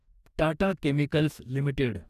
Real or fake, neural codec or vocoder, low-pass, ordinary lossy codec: fake; codec, 44.1 kHz, 2.6 kbps, DAC; 14.4 kHz; none